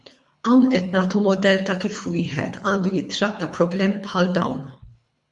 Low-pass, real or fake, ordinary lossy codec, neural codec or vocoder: 10.8 kHz; fake; MP3, 64 kbps; codec, 24 kHz, 3 kbps, HILCodec